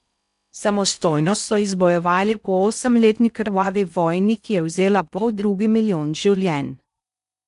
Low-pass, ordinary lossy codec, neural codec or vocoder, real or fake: 10.8 kHz; none; codec, 16 kHz in and 24 kHz out, 0.6 kbps, FocalCodec, streaming, 4096 codes; fake